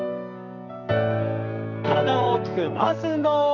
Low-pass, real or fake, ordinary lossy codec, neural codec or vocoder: 7.2 kHz; fake; none; codec, 44.1 kHz, 2.6 kbps, SNAC